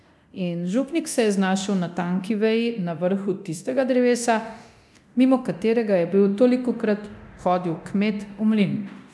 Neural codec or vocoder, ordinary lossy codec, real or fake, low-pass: codec, 24 kHz, 0.9 kbps, DualCodec; none; fake; none